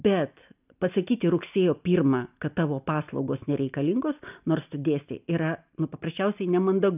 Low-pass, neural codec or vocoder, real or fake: 3.6 kHz; none; real